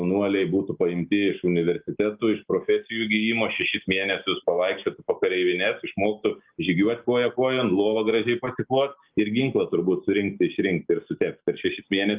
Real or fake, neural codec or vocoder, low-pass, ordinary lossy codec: real; none; 3.6 kHz; Opus, 32 kbps